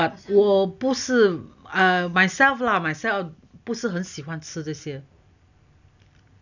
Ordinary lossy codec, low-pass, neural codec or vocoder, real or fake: none; 7.2 kHz; none; real